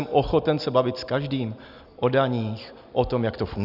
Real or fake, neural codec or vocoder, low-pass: real; none; 5.4 kHz